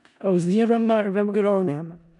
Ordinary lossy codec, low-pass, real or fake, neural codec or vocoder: none; 10.8 kHz; fake; codec, 16 kHz in and 24 kHz out, 0.4 kbps, LongCat-Audio-Codec, four codebook decoder